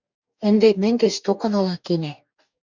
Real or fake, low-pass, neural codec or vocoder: fake; 7.2 kHz; codec, 44.1 kHz, 2.6 kbps, DAC